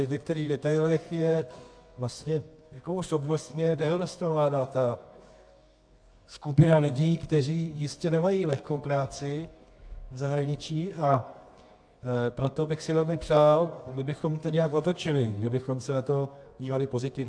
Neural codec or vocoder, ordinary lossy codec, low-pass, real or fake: codec, 24 kHz, 0.9 kbps, WavTokenizer, medium music audio release; AAC, 64 kbps; 9.9 kHz; fake